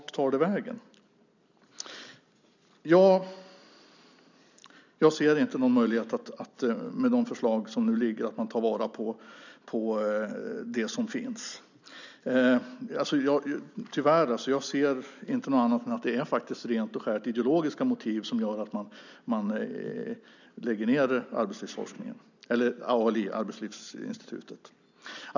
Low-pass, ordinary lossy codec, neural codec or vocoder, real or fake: 7.2 kHz; none; none; real